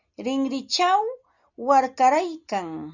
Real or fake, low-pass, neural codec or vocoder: real; 7.2 kHz; none